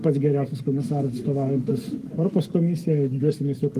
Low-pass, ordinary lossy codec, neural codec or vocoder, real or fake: 14.4 kHz; Opus, 24 kbps; codec, 44.1 kHz, 7.8 kbps, Pupu-Codec; fake